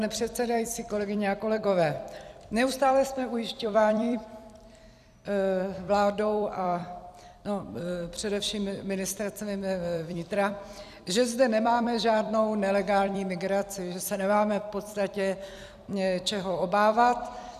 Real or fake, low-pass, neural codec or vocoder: fake; 14.4 kHz; vocoder, 44.1 kHz, 128 mel bands every 256 samples, BigVGAN v2